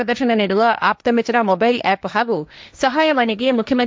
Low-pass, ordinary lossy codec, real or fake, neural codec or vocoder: none; none; fake; codec, 16 kHz, 1.1 kbps, Voila-Tokenizer